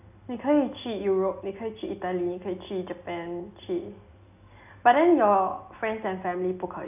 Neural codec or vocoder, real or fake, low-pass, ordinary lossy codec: none; real; 3.6 kHz; none